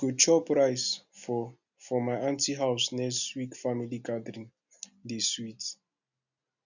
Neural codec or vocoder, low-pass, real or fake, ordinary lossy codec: none; 7.2 kHz; real; none